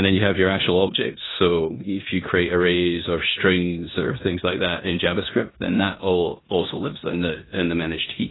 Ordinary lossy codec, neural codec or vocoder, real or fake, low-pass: AAC, 16 kbps; codec, 16 kHz in and 24 kHz out, 0.9 kbps, LongCat-Audio-Codec, four codebook decoder; fake; 7.2 kHz